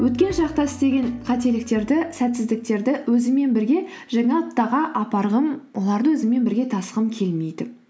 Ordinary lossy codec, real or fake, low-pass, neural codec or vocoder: none; real; none; none